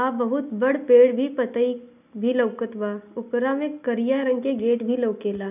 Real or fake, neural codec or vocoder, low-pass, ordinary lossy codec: real; none; 3.6 kHz; none